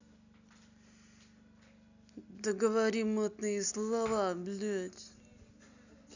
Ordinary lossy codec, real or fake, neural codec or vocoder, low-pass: none; real; none; 7.2 kHz